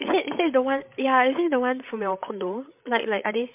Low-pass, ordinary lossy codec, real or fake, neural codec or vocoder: 3.6 kHz; MP3, 32 kbps; fake; codec, 16 kHz, 16 kbps, FreqCodec, larger model